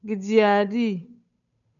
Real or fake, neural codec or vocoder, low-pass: fake; codec, 16 kHz, 8 kbps, FunCodec, trained on Chinese and English, 25 frames a second; 7.2 kHz